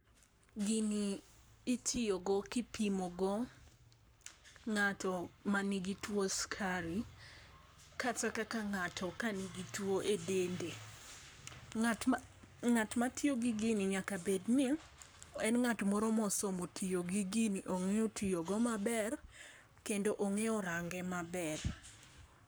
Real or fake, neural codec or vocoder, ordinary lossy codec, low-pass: fake; codec, 44.1 kHz, 7.8 kbps, Pupu-Codec; none; none